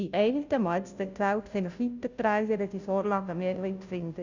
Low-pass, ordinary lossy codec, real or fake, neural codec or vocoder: 7.2 kHz; none; fake; codec, 16 kHz, 0.5 kbps, FunCodec, trained on Chinese and English, 25 frames a second